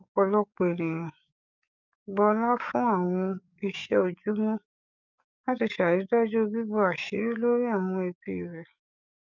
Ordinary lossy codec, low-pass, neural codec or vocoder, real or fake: none; 7.2 kHz; codec, 44.1 kHz, 7.8 kbps, DAC; fake